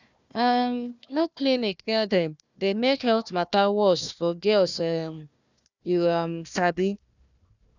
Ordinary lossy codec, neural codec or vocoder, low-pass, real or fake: none; codec, 16 kHz, 1 kbps, FunCodec, trained on Chinese and English, 50 frames a second; 7.2 kHz; fake